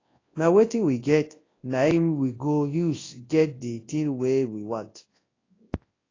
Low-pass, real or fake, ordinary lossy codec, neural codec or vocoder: 7.2 kHz; fake; AAC, 32 kbps; codec, 24 kHz, 0.9 kbps, WavTokenizer, large speech release